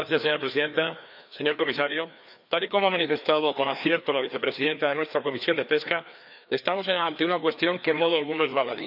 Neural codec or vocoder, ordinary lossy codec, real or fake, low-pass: codec, 16 kHz, 2 kbps, FreqCodec, larger model; none; fake; 5.4 kHz